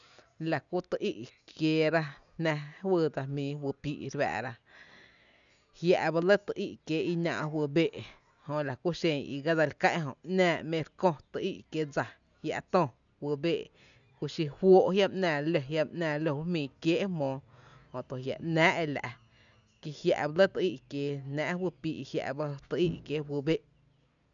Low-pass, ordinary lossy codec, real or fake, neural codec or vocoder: 7.2 kHz; none; real; none